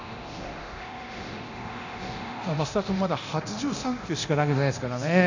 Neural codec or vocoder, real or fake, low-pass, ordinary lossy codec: codec, 24 kHz, 0.9 kbps, DualCodec; fake; 7.2 kHz; none